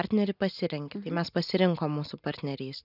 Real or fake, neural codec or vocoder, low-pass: real; none; 5.4 kHz